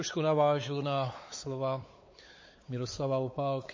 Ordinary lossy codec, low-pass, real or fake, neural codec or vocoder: MP3, 32 kbps; 7.2 kHz; fake; codec, 16 kHz, 4 kbps, X-Codec, WavLM features, trained on Multilingual LibriSpeech